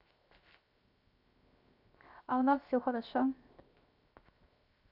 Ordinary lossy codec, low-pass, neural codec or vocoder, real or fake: none; 5.4 kHz; codec, 16 kHz, 0.7 kbps, FocalCodec; fake